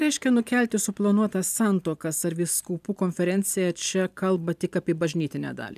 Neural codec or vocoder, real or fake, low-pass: vocoder, 44.1 kHz, 128 mel bands every 512 samples, BigVGAN v2; fake; 14.4 kHz